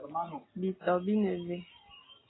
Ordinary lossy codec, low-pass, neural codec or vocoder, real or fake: AAC, 16 kbps; 7.2 kHz; none; real